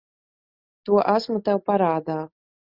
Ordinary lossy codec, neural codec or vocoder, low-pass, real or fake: Opus, 64 kbps; none; 5.4 kHz; real